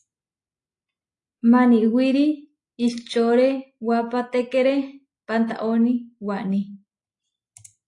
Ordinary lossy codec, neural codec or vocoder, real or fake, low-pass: AAC, 48 kbps; none; real; 10.8 kHz